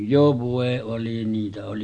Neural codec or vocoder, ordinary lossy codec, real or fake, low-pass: none; none; real; 9.9 kHz